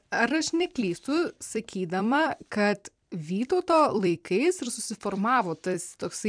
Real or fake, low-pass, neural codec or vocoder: fake; 9.9 kHz; vocoder, 44.1 kHz, 128 mel bands every 256 samples, BigVGAN v2